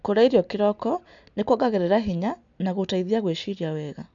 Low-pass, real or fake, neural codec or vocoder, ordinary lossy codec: 7.2 kHz; real; none; MP3, 64 kbps